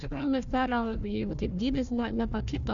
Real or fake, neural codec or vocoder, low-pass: fake; codec, 16 kHz, 1 kbps, FunCodec, trained on Chinese and English, 50 frames a second; 7.2 kHz